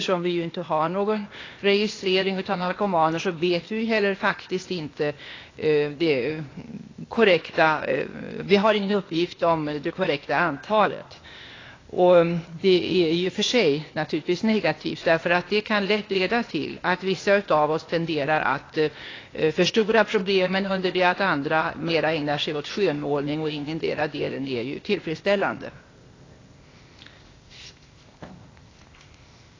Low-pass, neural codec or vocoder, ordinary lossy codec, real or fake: 7.2 kHz; codec, 16 kHz, 0.8 kbps, ZipCodec; AAC, 32 kbps; fake